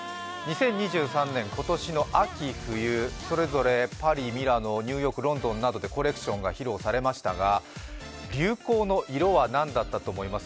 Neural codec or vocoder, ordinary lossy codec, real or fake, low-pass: none; none; real; none